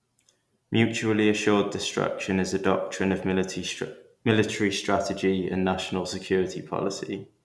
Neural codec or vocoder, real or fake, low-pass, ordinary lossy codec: none; real; none; none